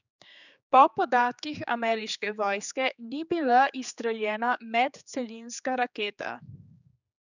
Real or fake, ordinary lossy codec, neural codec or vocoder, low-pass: fake; none; codec, 16 kHz, 4 kbps, X-Codec, HuBERT features, trained on general audio; 7.2 kHz